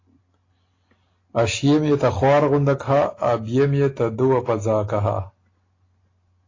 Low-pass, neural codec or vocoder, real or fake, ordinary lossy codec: 7.2 kHz; none; real; AAC, 32 kbps